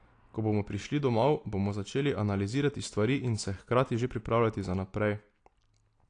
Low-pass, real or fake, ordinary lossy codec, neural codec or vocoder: 9.9 kHz; real; AAC, 48 kbps; none